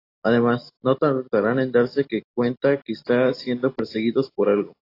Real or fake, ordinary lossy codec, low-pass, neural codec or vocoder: real; AAC, 32 kbps; 5.4 kHz; none